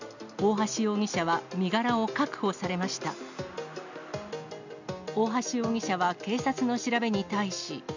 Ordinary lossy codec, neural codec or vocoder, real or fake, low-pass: none; none; real; 7.2 kHz